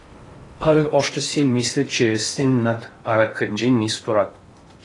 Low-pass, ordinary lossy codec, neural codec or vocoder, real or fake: 10.8 kHz; AAC, 32 kbps; codec, 16 kHz in and 24 kHz out, 0.6 kbps, FocalCodec, streaming, 4096 codes; fake